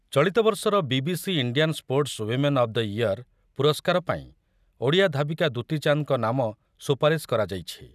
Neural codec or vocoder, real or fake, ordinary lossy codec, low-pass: none; real; none; 14.4 kHz